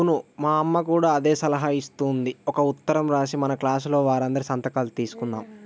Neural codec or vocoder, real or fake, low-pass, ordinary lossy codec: none; real; none; none